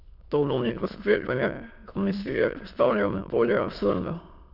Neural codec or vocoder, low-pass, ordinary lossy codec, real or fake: autoencoder, 22.05 kHz, a latent of 192 numbers a frame, VITS, trained on many speakers; 5.4 kHz; none; fake